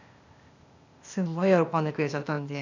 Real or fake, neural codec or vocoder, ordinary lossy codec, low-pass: fake; codec, 16 kHz, 0.8 kbps, ZipCodec; none; 7.2 kHz